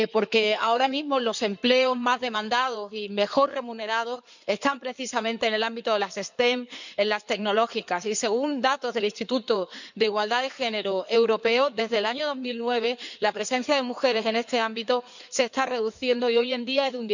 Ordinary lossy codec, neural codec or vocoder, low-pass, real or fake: none; codec, 16 kHz in and 24 kHz out, 2.2 kbps, FireRedTTS-2 codec; 7.2 kHz; fake